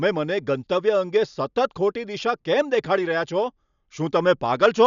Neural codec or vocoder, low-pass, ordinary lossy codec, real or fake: none; 7.2 kHz; none; real